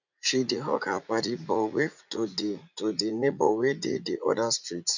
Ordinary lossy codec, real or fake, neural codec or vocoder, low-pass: none; fake; vocoder, 44.1 kHz, 80 mel bands, Vocos; 7.2 kHz